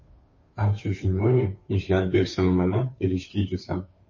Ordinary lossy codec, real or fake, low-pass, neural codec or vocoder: MP3, 32 kbps; fake; 7.2 kHz; codec, 16 kHz, 2 kbps, FunCodec, trained on Chinese and English, 25 frames a second